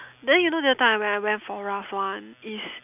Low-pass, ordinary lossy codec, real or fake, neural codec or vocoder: 3.6 kHz; none; real; none